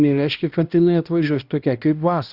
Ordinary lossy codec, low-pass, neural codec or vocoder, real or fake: Opus, 64 kbps; 5.4 kHz; codec, 16 kHz, 1 kbps, X-Codec, HuBERT features, trained on balanced general audio; fake